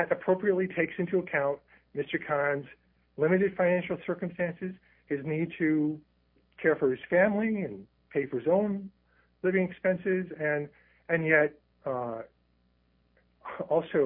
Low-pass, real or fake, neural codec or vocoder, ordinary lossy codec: 5.4 kHz; real; none; MP3, 32 kbps